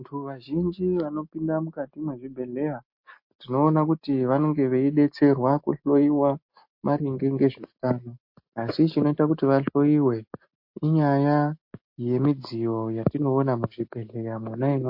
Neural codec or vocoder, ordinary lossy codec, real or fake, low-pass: none; MP3, 32 kbps; real; 5.4 kHz